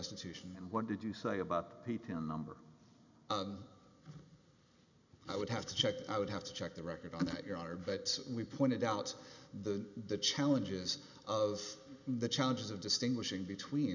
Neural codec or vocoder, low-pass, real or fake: none; 7.2 kHz; real